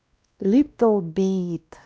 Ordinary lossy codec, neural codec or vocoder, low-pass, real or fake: none; codec, 16 kHz, 1 kbps, X-Codec, WavLM features, trained on Multilingual LibriSpeech; none; fake